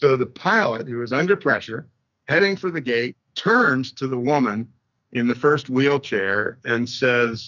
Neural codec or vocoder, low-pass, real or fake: codec, 44.1 kHz, 2.6 kbps, SNAC; 7.2 kHz; fake